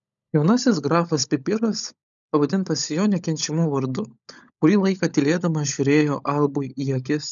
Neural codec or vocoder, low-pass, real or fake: codec, 16 kHz, 16 kbps, FunCodec, trained on LibriTTS, 50 frames a second; 7.2 kHz; fake